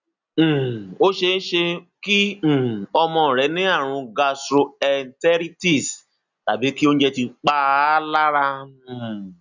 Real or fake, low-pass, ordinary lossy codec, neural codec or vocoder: real; 7.2 kHz; none; none